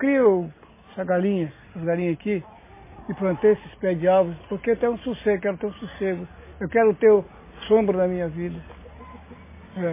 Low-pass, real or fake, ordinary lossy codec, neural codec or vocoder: 3.6 kHz; real; MP3, 16 kbps; none